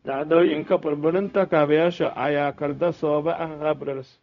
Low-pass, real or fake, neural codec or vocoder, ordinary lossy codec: 7.2 kHz; fake; codec, 16 kHz, 0.4 kbps, LongCat-Audio-Codec; none